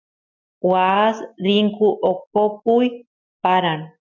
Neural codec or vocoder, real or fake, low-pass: none; real; 7.2 kHz